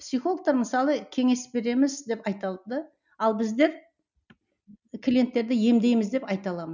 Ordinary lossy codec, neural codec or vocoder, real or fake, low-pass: none; none; real; 7.2 kHz